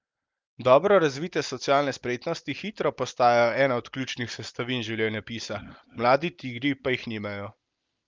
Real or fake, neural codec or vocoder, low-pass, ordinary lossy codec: real; none; 7.2 kHz; Opus, 24 kbps